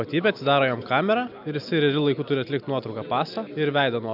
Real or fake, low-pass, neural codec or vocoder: real; 5.4 kHz; none